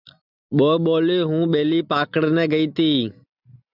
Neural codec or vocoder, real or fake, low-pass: none; real; 5.4 kHz